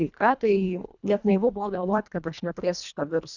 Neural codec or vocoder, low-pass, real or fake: codec, 24 kHz, 1.5 kbps, HILCodec; 7.2 kHz; fake